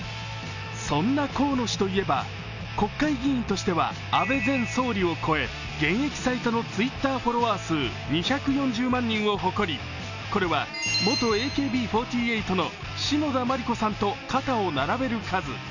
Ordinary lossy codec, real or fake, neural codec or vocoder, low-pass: none; real; none; 7.2 kHz